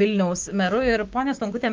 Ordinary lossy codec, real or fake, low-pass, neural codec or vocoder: Opus, 24 kbps; fake; 7.2 kHz; codec, 16 kHz, 6 kbps, DAC